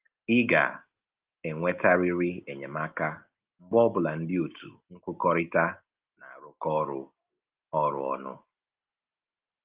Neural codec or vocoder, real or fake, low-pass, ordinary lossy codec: none; real; 3.6 kHz; Opus, 32 kbps